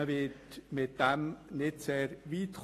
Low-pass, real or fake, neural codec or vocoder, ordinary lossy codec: 14.4 kHz; real; none; AAC, 48 kbps